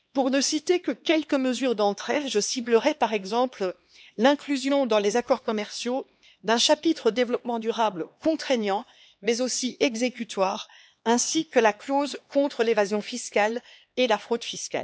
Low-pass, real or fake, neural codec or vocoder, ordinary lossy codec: none; fake; codec, 16 kHz, 2 kbps, X-Codec, HuBERT features, trained on LibriSpeech; none